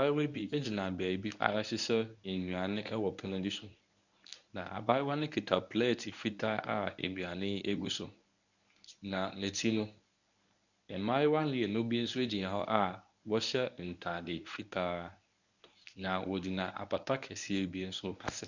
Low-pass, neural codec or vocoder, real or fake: 7.2 kHz; codec, 24 kHz, 0.9 kbps, WavTokenizer, medium speech release version 1; fake